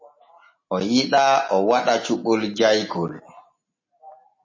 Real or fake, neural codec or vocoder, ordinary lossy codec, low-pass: real; none; MP3, 32 kbps; 7.2 kHz